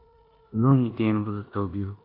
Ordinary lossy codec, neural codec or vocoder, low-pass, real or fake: AAC, 32 kbps; codec, 16 kHz in and 24 kHz out, 0.9 kbps, LongCat-Audio-Codec, four codebook decoder; 5.4 kHz; fake